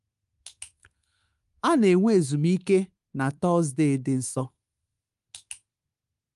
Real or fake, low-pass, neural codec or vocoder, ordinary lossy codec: fake; 10.8 kHz; codec, 24 kHz, 3.1 kbps, DualCodec; Opus, 32 kbps